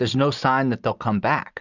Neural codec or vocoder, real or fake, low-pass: none; real; 7.2 kHz